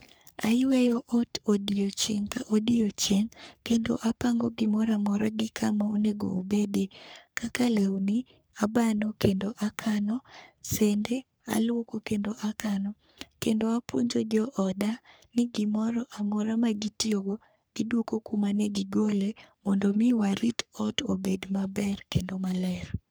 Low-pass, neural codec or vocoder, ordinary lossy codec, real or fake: none; codec, 44.1 kHz, 3.4 kbps, Pupu-Codec; none; fake